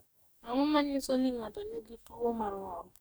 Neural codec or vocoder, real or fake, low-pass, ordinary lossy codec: codec, 44.1 kHz, 2.6 kbps, DAC; fake; none; none